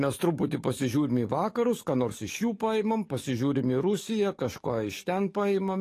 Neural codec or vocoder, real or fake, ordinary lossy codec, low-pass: codec, 44.1 kHz, 7.8 kbps, DAC; fake; AAC, 48 kbps; 14.4 kHz